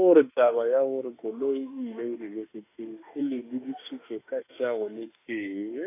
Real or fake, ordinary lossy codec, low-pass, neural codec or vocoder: fake; AAC, 24 kbps; 3.6 kHz; autoencoder, 48 kHz, 32 numbers a frame, DAC-VAE, trained on Japanese speech